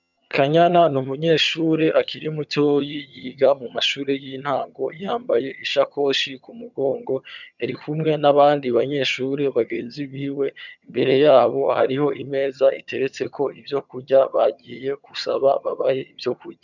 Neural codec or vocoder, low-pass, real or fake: vocoder, 22.05 kHz, 80 mel bands, HiFi-GAN; 7.2 kHz; fake